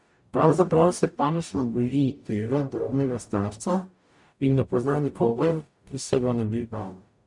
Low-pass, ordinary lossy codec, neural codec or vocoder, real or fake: 10.8 kHz; MP3, 64 kbps; codec, 44.1 kHz, 0.9 kbps, DAC; fake